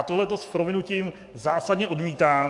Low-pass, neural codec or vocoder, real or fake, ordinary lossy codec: 10.8 kHz; codec, 44.1 kHz, 7.8 kbps, DAC; fake; AAC, 64 kbps